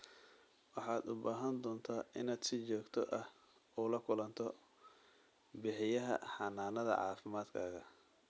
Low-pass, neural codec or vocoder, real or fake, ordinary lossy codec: none; none; real; none